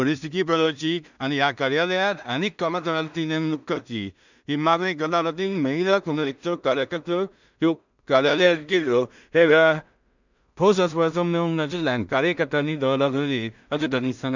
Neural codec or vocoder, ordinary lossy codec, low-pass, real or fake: codec, 16 kHz in and 24 kHz out, 0.4 kbps, LongCat-Audio-Codec, two codebook decoder; none; 7.2 kHz; fake